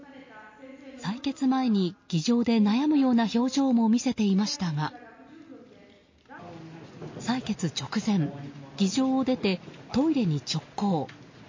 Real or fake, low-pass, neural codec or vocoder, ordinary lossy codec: real; 7.2 kHz; none; MP3, 32 kbps